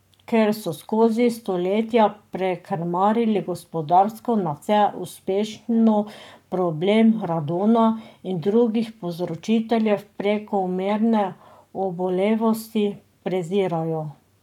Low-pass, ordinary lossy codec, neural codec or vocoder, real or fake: 19.8 kHz; none; codec, 44.1 kHz, 7.8 kbps, Pupu-Codec; fake